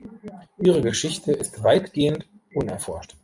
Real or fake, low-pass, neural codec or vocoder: fake; 10.8 kHz; vocoder, 44.1 kHz, 128 mel bands every 256 samples, BigVGAN v2